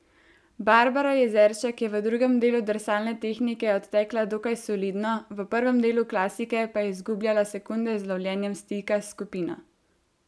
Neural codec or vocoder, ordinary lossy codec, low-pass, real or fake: none; none; none; real